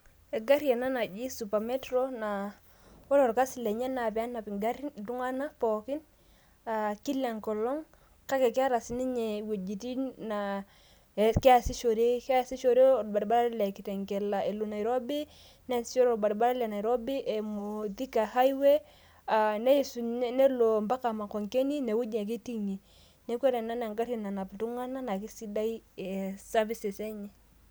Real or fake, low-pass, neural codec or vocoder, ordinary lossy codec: real; none; none; none